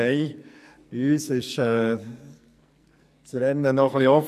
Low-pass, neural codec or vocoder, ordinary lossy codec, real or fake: 14.4 kHz; codec, 44.1 kHz, 2.6 kbps, SNAC; none; fake